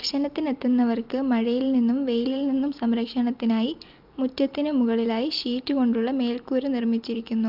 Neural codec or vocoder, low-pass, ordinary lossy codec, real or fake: none; 5.4 kHz; Opus, 24 kbps; real